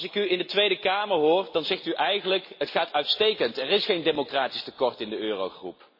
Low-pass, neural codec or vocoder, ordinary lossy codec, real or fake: 5.4 kHz; none; MP3, 24 kbps; real